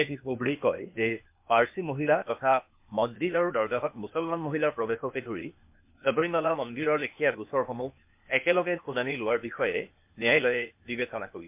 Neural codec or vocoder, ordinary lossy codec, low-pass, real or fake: codec, 16 kHz, 0.8 kbps, ZipCodec; MP3, 32 kbps; 3.6 kHz; fake